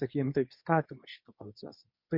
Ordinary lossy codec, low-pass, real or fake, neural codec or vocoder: MP3, 32 kbps; 5.4 kHz; fake; codec, 24 kHz, 0.9 kbps, WavTokenizer, medium speech release version 2